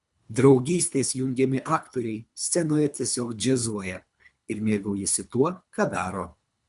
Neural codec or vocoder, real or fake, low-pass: codec, 24 kHz, 3 kbps, HILCodec; fake; 10.8 kHz